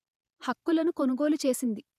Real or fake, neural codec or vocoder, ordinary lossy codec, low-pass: fake; vocoder, 48 kHz, 128 mel bands, Vocos; none; 14.4 kHz